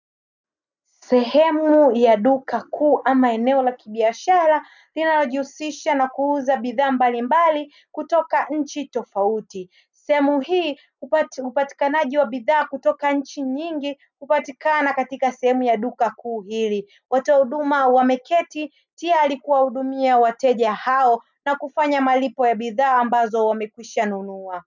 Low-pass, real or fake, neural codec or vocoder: 7.2 kHz; real; none